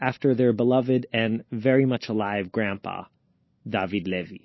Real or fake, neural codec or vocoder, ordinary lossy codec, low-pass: real; none; MP3, 24 kbps; 7.2 kHz